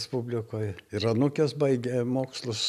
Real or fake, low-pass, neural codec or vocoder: real; 14.4 kHz; none